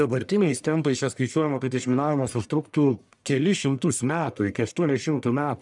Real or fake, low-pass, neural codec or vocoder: fake; 10.8 kHz; codec, 44.1 kHz, 1.7 kbps, Pupu-Codec